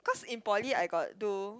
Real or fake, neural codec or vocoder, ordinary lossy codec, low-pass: real; none; none; none